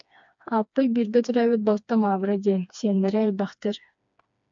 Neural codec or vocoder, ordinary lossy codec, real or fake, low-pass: codec, 16 kHz, 2 kbps, FreqCodec, smaller model; MP3, 64 kbps; fake; 7.2 kHz